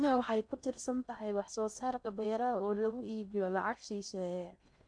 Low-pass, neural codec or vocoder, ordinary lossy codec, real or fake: 9.9 kHz; codec, 16 kHz in and 24 kHz out, 0.6 kbps, FocalCodec, streaming, 4096 codes; none; fake